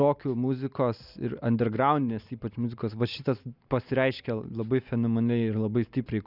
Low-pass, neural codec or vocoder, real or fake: 5.4 kHz; none; real